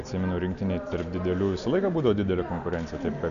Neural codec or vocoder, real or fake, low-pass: none; real; 7.2 kHz